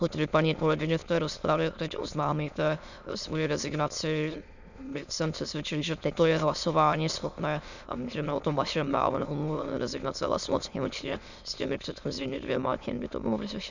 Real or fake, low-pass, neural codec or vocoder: fake; 7.2 kHz; autoencoder, 22.05 kHz, a latent of 192 numbers a frame, VITS, trained on many speakers